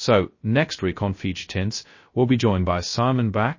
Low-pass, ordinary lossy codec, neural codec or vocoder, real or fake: 7.2 kHz; MP3, 32 kbps; codec, 16 kHz, 0.2 kbps, FocalCodec; fake